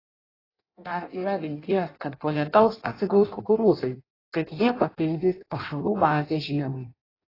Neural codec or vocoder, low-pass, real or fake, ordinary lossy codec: codec, 16 kHz in and 24 kHz out, 0.6 kbps, FireRedTTS-2 codec; 5.4 kHz; fake; AAC, 24 kbps